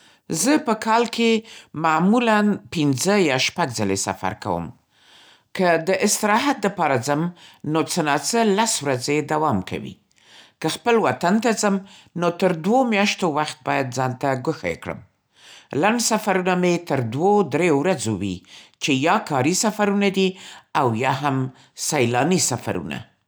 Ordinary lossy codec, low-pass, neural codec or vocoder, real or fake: none; none; none; real